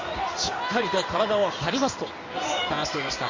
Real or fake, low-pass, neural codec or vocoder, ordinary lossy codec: fake; 7.2 kHz; codec, 16 kHz in and 24 kHz out, 1 kbps, XY-Tokenizer; MP3, 48 kbps